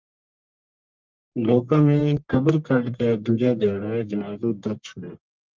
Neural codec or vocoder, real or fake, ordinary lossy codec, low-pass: codec, 44.1 kHz, 1.7 kbps, Pupu-Codec; fake; Opus, 24 kbps; 7.2 kHz